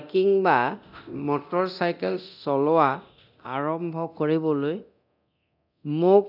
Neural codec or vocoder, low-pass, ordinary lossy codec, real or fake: codec, 24 kHz, 0.9 kbps, DualCodec; 5.4 kHz; none; fake